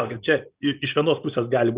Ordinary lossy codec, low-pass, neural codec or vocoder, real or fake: Opus, 64 kbps; 3.6 kHz; none; real